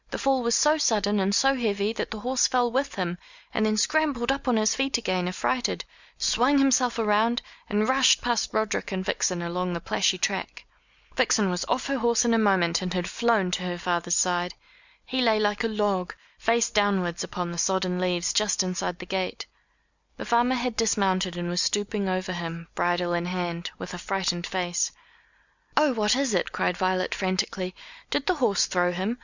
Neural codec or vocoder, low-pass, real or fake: none; 7.2 kHz; real